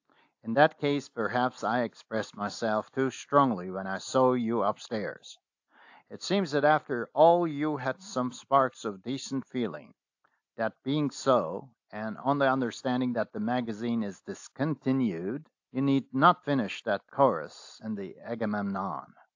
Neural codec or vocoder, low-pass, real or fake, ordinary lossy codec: none; 7.2 kHz; real; AAC, 48 kbps